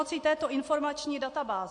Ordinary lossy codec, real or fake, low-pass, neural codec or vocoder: MP3, 48 kbps; real; 10.8 kHz; none